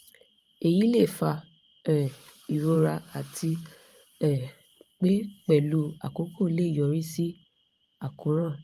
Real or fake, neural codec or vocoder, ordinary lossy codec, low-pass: fake; vocoder, 44.1 kHz, 128 mel bands every 512 samples, BigVGAN v2; Opus, 32 kbps; 14.4 kHz